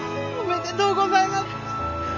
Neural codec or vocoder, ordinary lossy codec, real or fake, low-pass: none; none; real; 7.2 kHz